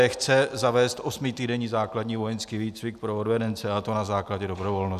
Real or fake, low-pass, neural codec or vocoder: real; 14.4 kHz; none